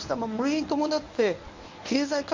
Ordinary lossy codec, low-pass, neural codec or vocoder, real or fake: MP3, 64 kbps; 7.2 kHz; codec, 24 kHz, 0.9 kbps, WavTokenizer, medium speech release version 1; fake